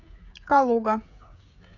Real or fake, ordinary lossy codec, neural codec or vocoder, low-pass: fake; none; codec, 16 kHz, 16 kbps, FreqCodec, smaller model; 7.2 kHz